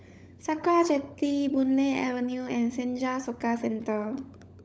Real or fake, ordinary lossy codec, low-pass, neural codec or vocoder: fake; none; none; codec, 16 kHz, 16 kbps, FunCodec, trained on LibriTTS, 50 frames a second